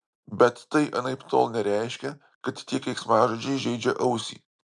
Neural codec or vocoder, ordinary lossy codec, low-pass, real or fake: none; MP3, 96 kbps; 10.8 kHz; real